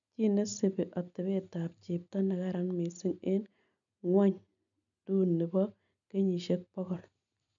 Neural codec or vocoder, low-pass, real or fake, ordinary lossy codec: none; 7.2 kHz; real; none